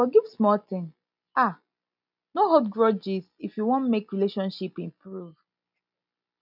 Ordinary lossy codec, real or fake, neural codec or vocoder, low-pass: none; real; none; 5.4 kHz